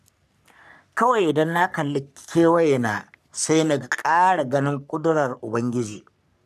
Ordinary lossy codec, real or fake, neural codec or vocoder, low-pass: none; fake; codec, 44.1 kHz, 3.4 kbps, Pupu-Codec; 14.4 kHz